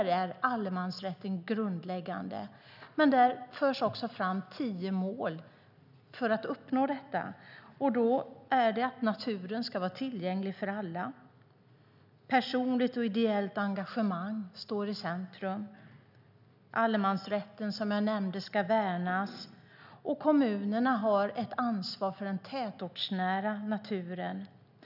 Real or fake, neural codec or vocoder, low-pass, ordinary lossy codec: real; none; 5.4 kHz; none